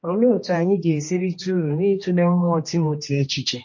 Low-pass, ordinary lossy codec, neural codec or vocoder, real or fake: 7.2 kHz; MP3, 32 kbps; codec, 16 kHz, 1 kbps, X-Codec, HuBERT features, trained on general audio; fake